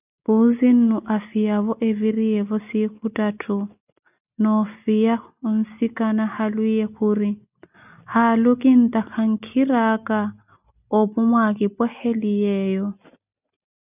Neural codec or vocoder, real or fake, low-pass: none; real; 3.6 kHz